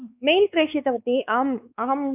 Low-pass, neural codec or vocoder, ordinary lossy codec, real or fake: 3.6 kHz; codec, 16 kHz, 4 kbps, X-Codec, WavLM features, trained on Multilingual LibriSpeech; none; fake